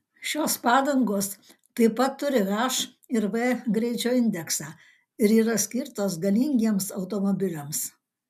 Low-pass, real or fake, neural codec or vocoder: 14.4 kHz; real; none